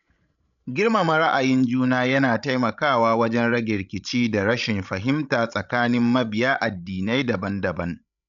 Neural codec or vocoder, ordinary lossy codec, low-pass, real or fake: codec, 16 kHz, 16 kbps, FreqCodec, larger model; none; 7.2 kHz; fake